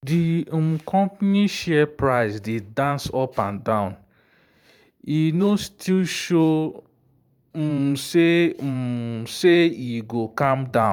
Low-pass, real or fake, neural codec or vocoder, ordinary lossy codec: 19.8 kHz; fake; vocoder, 44.1 kHz, 128 mel bands every 512 samples, BigVGAN v2; none